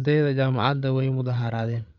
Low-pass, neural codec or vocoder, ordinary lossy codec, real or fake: 7.2 kHz; codec, 16 kHz, 8 kbps, FreqCodec, larger model; none; fake